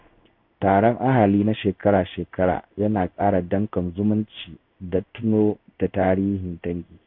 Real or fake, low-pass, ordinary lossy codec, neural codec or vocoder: fake; 5.4 kHz; none; codec, 16 kHz in and 24 kHz out, 1 kbps, XY-Tokenizer